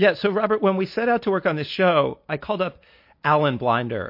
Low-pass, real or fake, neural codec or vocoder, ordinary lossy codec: 5.4 kHz; real; none; MP3, 32 kbps